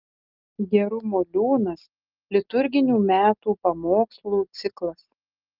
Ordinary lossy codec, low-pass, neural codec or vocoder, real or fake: Opus, 32 kbps; 5.4 kHz; none; real